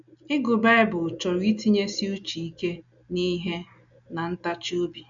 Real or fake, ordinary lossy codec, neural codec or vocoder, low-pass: real; none; none; 7.2 kHz